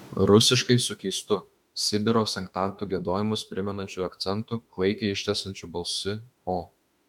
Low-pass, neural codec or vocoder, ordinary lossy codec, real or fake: 19.8 kHz; autoencoder, 48 kHz, 32 numbers a frame, DAC-VAE, trained on Japanese speech; MP3, 96 kbps; fake